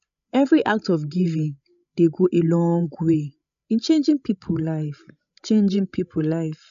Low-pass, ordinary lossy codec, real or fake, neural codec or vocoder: 7.2 kHz; none; fake; codec, 16 kHz, 16 kbps, FreqCodec, larger model